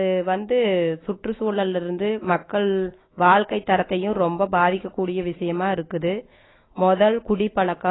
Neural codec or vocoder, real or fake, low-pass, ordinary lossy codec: autoencoder, 48 kHz, 128 numbers a frame, DAC-VAE, trained on Japanese speech; fake; 7.2 kHz; AAC, 16 kbps